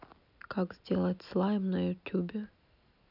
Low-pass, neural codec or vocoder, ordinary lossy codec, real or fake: 5.4 kHz; none; none; real